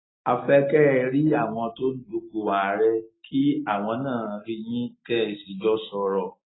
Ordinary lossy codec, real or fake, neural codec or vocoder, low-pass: AAC, 16 kbps; real; none; 7.2 kHz